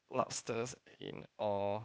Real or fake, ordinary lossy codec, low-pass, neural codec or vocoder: fake; none; none; codec, 16 kHz, 0.8 kbps, ZipCodec